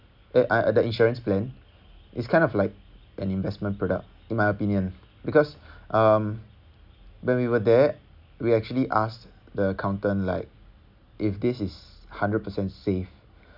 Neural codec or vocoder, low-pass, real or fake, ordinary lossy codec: none; 5.4 kHz; real; none